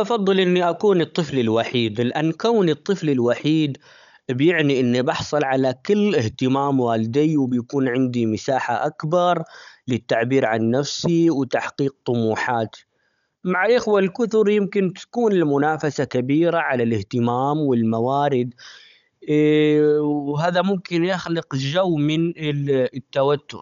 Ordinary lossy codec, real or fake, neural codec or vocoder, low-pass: none; fake; codec, 16 kHz, 16 kbps, FunCodec, trained on Chinese and English, 50 frames a second; 7.2 kHz